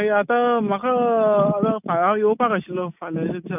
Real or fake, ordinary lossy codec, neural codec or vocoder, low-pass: real; none; none; 3.6 kHz